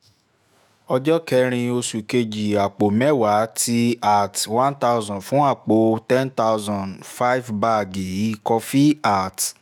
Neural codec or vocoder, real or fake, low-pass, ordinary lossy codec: autoencoder, 48 kHz, 128 numbers a frame, DAC-VAE, trained on Japanese speech; fake; none; none